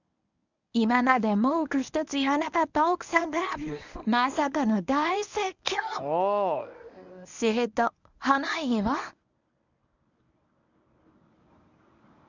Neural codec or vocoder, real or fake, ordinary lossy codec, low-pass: codec, 24 kHz, 0.9 kbps, WavTokenizer, medium speech release version 1; fake; none; 7.2 kHz